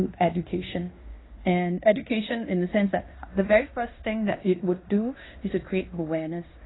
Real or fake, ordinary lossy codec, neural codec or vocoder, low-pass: fake; AAC, 16 kbps; codec, 16 kHz in and 24 kHz out, 0.9 kbps, LongCat-Audio-Codec, fine tuned four codebook decoder; 7.2 kHz